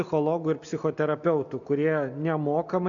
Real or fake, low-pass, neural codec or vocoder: real; 7.2 kHz; none